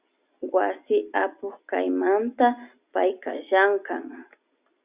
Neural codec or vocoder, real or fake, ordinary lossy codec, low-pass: none; real; Opus, 64 kbps; 3.6 kHz